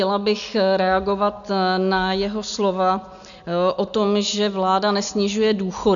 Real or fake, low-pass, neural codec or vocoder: real; 7.2 kHz; none